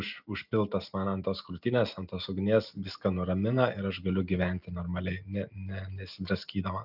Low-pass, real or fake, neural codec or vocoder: 5.4 kHz; real; none